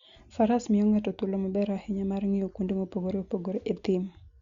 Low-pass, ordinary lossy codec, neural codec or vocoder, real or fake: 7.2 kHz; Opus, 64 kbps; none; real